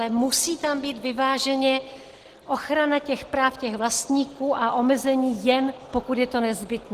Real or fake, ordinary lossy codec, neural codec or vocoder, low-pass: real; Opus, 16 kbps; none; 14.4 kHz